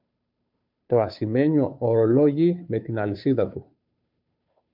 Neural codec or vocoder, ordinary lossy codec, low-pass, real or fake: codec, 16 kHz, 2 kbps, FunCodec, trained on Chinese and English, 25 frames a second; MP3, 48 kbps; 5.4 kHz; fake